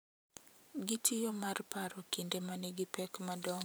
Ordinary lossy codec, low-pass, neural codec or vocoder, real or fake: none; none; vocoder, 44.1 kHz, 128 mel bands every 512 samples, BigVGAN v2; fake